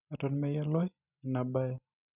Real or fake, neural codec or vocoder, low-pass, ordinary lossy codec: real; none; 3.6 kHz; none